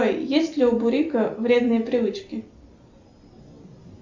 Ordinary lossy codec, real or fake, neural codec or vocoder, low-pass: MP3, 64 kbps; real; none; 7.2 kHz